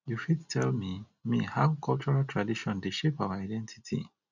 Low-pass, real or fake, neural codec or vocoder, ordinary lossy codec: 7.2 kHz; real; none; none